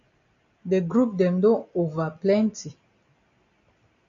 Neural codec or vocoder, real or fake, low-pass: none; real; 7.2 kHz